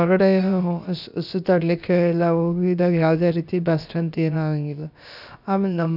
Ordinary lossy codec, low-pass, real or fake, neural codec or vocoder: none; 5.4 kHz; fake; codec, 16 kHz, about 1 kbps, DyCAST, with the encoder's durations